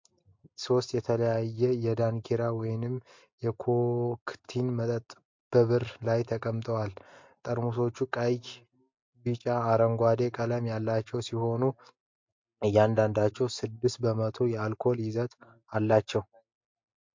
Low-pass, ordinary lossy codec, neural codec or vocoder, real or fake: 7.2 kHz; MP3, 48 kbps; none; real